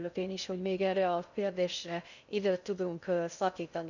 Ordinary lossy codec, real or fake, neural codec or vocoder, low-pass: none; fake; codec, 16 kHz in and 24 kHz out, 0.6 kbps, FocalCodec, streaming, 2048 codes; 7.2 kHz